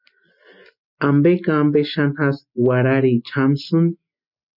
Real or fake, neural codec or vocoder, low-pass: real; none; 5.4 kHz